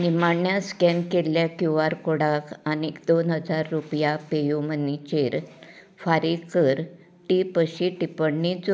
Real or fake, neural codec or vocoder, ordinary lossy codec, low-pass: real; none; none; none